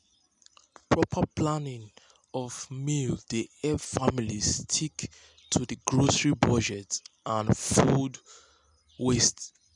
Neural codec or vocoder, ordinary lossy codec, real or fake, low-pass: none; none; real; 10.8 kHz